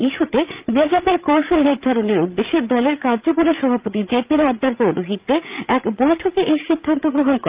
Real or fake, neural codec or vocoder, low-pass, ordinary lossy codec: fake; codec, 16 kHz, 16 kbps, FreqCodec, larger model; 3.6 kHz; Opus, 24 kbps